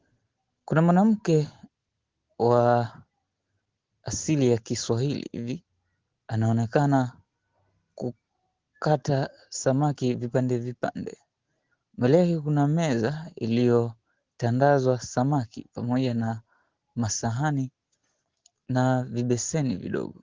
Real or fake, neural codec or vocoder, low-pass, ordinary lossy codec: real; none; 7.2 kHz; Opus, 16 kbps